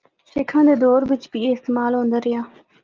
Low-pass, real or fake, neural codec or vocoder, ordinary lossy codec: 7.2 kHz; real; none; Opus, 32 kbps